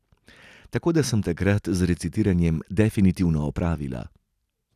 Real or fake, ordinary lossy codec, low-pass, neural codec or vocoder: real; none; 14.4 kHz; none